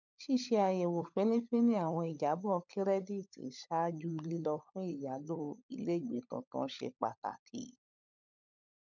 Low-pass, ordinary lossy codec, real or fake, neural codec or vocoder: 7.2 kHz; none; fake; codec, 16 kHz, 16 kbps, FunCodec, trained on LibriTTS, 50 frames a second